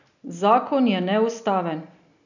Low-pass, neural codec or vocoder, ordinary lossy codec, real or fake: 7.2 kHz; none; none; real